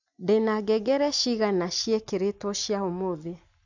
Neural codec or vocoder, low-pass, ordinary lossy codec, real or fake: none; 7.2 kHz; none; real